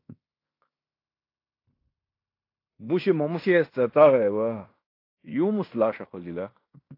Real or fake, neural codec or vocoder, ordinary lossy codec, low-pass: fake; codec, 16 kHz in and 24 kHz out, 0.9 kbps, LongCat-Audio-Codec, fine tuned four codebook decoder; AAC, 32 kbps; 5.4 kHz